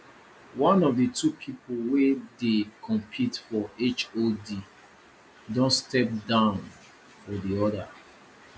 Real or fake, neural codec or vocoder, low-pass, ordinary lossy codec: real; none; none; none